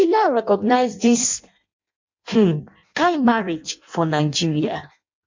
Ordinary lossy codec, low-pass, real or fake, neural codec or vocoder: MP3, 48 kbps; 7.2 kHz; fake; codec, 16 kHz in and 24 kHz out, 0.6 kbps, FireRedTTS-2 codec